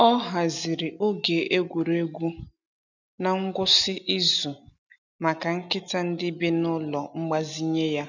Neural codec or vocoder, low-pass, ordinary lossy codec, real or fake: none; 7.2 kHz; none; real